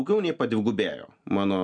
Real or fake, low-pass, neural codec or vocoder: real; 9.9 kHz; none